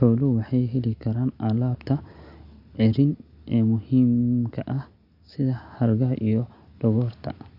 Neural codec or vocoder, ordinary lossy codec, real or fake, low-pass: none; Opus, 64 kbps; real; 5.4 kHz